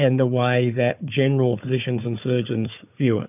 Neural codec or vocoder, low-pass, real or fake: codec, 16 kHz, 4 kbps, FunCodec, trained on Chinese and English, 50 frames a second; 3.6 kHz; fake